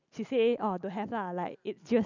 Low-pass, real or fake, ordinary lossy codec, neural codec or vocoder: 7.2 kHz; real; none; none